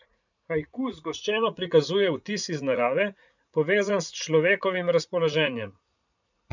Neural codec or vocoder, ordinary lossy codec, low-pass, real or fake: vocoder, 44.1 kHz, 80 mel bands, Vocos; none; 7.2 kHz; fake